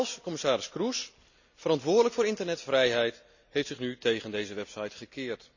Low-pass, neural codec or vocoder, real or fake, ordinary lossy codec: 7.2 kHz; none; real; none